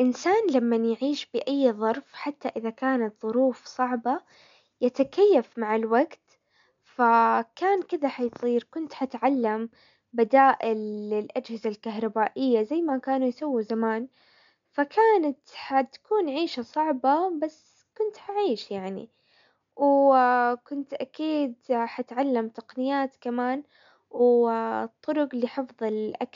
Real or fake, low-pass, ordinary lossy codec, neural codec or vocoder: real; 7.2 kHz; MP3, 64 kbps; none